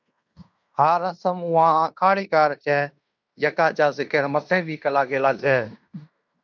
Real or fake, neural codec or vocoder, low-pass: fake; codec, 16 kHz in and 24 kHz out, 0.9 kbps, LongCat-Audio-Codec, fine tuned four codebook decoder; 7.2 kHz